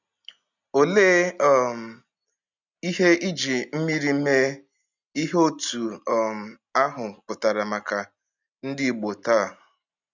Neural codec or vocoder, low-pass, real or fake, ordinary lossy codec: none; 7.2 kHz; real; none